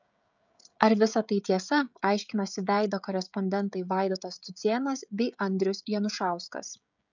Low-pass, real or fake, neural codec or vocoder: 7.2 kHz; fake; codec, 16 kHz, 16 kbps, FreqCodec, smaller model